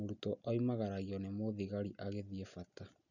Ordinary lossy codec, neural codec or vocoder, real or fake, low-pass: none; none; real; 7.2 kHz